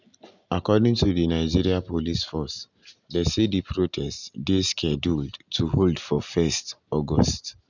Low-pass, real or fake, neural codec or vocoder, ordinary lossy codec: 7.2 kHz; real; none; none